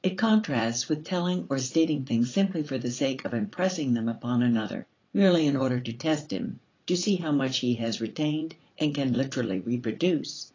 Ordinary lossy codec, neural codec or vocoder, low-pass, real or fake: AAC, 32 kbps; vocoder, 44.1 kHz, 80 mel bands, Vocos; 7.2 kHz; fake